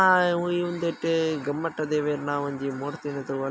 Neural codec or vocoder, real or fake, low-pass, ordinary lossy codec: none; real; none; none